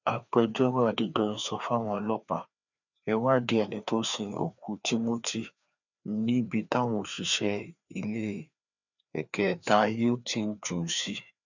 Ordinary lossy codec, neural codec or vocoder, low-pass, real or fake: none; codec, 16 kHz, 2 kbps, FreqCodec, larger model; 7.2 kHz; fake